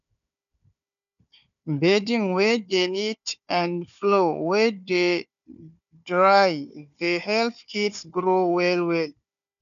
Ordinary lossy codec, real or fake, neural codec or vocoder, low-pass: none; fake; codec, 16 kHz, 4 kbps, FunCodec, trained on Chinese and English, 50 frames a second; 7.2 kHz